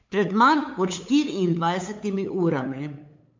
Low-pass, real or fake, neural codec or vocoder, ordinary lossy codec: 7.2 kHz; fake; codec, 16 kHz, 8 kbps, FunCodec, trained on LibriTTS, 25 frames a second; MP3, 64 kbps